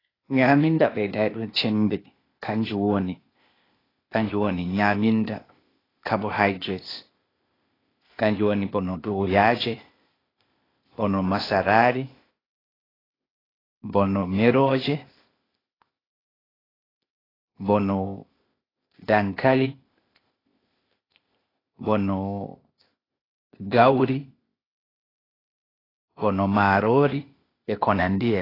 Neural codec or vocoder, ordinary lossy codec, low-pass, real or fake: codec, 16 kHz, 0.8 kbps, ZipCodec; AAC, 24 kbps; 5.4 kHz; fake